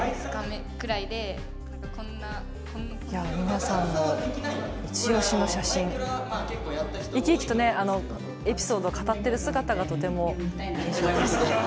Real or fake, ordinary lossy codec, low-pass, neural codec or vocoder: real; none; none; none